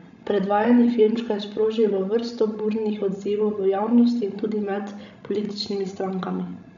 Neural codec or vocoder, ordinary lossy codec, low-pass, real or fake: codec, 16 kHz, 16 kbps, FreqCodec, larger model; none; 7.2 kHz; fake